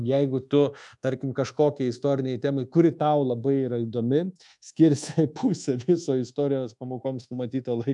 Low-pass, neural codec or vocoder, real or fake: 10.8 kHz; codec, 24 kHz, 1.2 kbps, DualCodec; fake